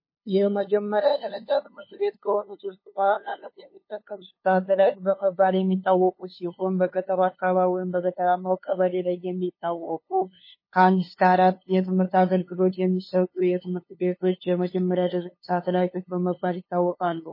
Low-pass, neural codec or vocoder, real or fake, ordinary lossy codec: 5.4 kHz; codec, 16 kHz, 2 kbps, FunCodec, trained on LibriTTS, 25 frames a second; fake; MP3, 24 kbps